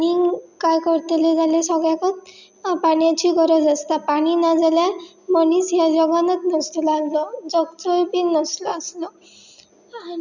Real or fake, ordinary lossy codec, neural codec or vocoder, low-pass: real; none; none; 7.2 kHz